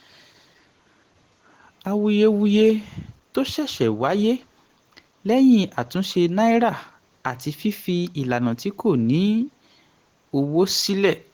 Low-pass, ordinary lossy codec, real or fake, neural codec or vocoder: 19.8 kHz; Opus, 16 kbps; real; none